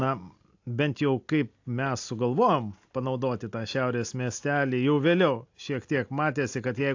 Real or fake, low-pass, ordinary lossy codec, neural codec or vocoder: real; 7.2 kHz; AAC, 48 kbps; none